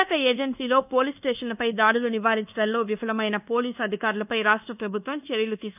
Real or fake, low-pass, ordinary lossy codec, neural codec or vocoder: fake; 3.6 kHz; none; codec, 24 kHz, 1.2 kbps, DualCodec